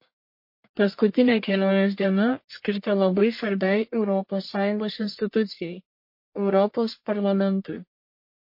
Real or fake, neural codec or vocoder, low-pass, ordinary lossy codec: fake; codec, 44.1 kHz, 1.7 kbps, Pupu-Codec; 5.4 kHz; MP3, 32 kbps